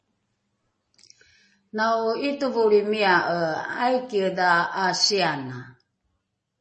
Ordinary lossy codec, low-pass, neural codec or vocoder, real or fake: MP3, 32 kbps; 10.8 kHz; none; real